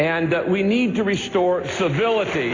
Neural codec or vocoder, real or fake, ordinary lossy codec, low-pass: none; real; AAC, 32 kbps; 7.2 kHz